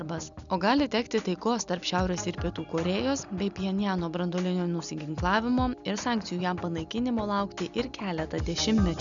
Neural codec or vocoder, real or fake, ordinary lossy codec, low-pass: none; real; MP3, 96 kbps; 7.2 kHz